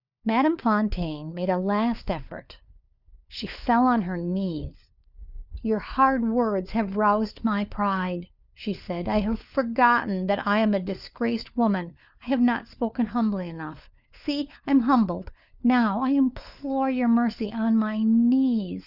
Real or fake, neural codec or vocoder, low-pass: fake; codec, 16 kHz, 4 kbps, FunCodec, trained on LibriTTS, 50 frames a second; 5.4 kHz